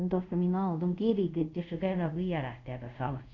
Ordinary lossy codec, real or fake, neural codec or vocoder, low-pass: none; fake; codec, 24 kHz, 0.5 kbps, DualCodec; 7.2 kHz